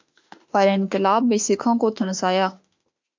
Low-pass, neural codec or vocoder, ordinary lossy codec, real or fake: 7.2 kHz; autoencoder, 48 kHz, 32 numbers a frame, DAC-VAE, trained on Japanese speech; MP3, 64 kbps; fake